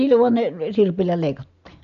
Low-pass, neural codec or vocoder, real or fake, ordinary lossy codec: 7.2 kHz; none; real; MP3, 64 kbps